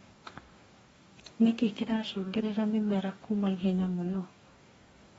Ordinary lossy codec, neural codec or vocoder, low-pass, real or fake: AAC, 24 kbps; codec, 44.1 kHz, 2.6 kbps, DAC; 19.8 kHz; fake